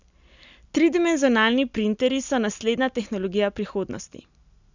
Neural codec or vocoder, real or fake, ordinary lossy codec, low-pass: none; real; none; 7.2 kHz